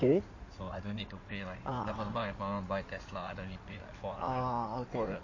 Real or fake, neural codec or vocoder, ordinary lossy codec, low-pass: fake; codec, 16 kHz in and 24 kHz out, 2.2 kbps, FireRedTTS-2 codec; MP3, 32 kbps; 7.2 kHz